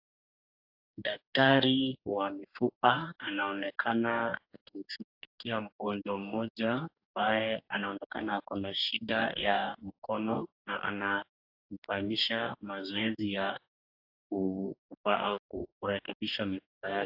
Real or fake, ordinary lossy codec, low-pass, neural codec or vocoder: fake; AAC, 48 kbps; 5.4 kHz; codec, 44.1 kHz, 2.6 kbps, DAC